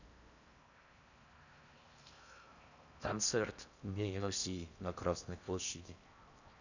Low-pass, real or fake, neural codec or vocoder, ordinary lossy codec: 7.2 kHz; fake; codec, 16 kHz in and 24 kHz out, 0.6 kbps, FocalCodec, streaming, 4096 codes; none